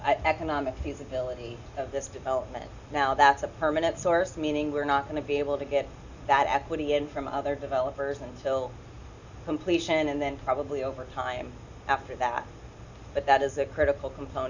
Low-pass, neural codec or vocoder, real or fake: 7.2 kHz; none; real